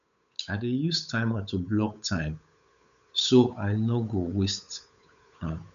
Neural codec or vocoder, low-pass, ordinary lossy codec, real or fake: codec, 16 kHz, 8 kbps, FunCodec, trained on Chinese and English, 25 frames a second; 7.2 kHz; none; fake